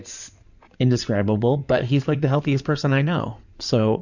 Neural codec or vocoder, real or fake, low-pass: codec, 16 kHz in and 24 kHz out, 2.2 kbps, FireRedTTS-2 codec; fake; 7.2 kHz